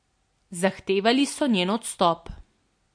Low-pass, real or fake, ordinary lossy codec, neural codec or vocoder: 9.9 kHz; real; MP3, 48 kbps; none